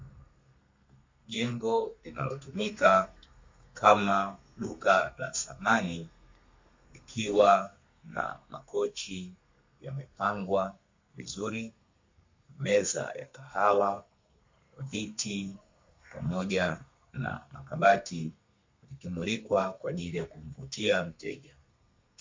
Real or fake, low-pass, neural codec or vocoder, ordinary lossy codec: fake; 7.2 kHz; codec, 32 kHz, 1.9 kbps, SNAC; MP3, 48 kbps